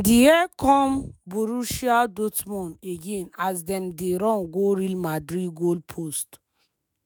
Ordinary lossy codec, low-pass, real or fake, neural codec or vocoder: none; none; fake; autoencoder, 48 kHz, 128 numbers a frame, DAC-VAE, trained on Japanese speech